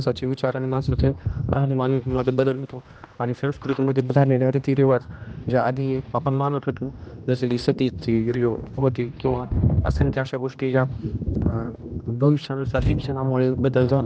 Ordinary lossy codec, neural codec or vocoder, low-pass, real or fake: none; codec, 16 kHz, 1 kbps, X-Codec, HuBERT features, trained on general audio; none; fake